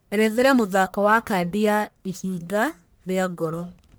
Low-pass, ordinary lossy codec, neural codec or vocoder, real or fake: none; none; codec, 44.1 kHz, 1.7 kbps, Pupu-Codec; fake